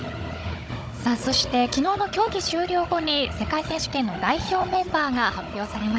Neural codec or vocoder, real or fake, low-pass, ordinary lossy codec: codec, 16 kHz, 16 kbps, FunCodec, trained on Chinese and English, 50 frames a second; fake; none; none